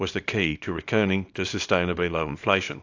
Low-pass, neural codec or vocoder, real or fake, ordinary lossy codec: 7.2 kHz; codec, 24 kHz, 0.9 kbps, WavTokenizer, small release; fake; AAC, 48 kbps